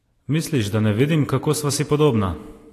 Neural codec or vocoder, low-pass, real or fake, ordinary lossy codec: none; 14.4 kHz; real; AAC, 48 kbps